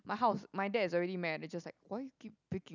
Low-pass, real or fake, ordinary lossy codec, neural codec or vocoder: 7.2 kHz; real; none; none